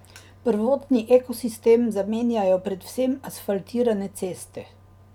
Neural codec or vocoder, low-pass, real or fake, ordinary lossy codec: none; 19.8 kHz; real; none